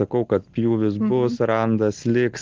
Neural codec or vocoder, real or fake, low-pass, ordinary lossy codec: none; real; 7.2 kHz; Opus, 16 kbps